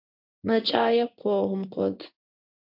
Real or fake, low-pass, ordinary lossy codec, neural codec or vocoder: fake; 5.4 kHz; MP3, 48 kbps; codec, 16 kHz in and 24 kHz out, 1 kbps, XY-Tokenizer